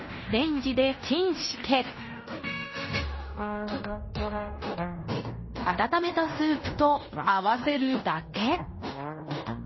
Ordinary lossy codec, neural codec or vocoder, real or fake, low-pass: MP3, 24 kbps; codec, 16 kHz in and 24 kHz out, 0.9 kbps, LongCat-Audio-Codec, fine tuned four codebook decoder; fake; 7.2 kHz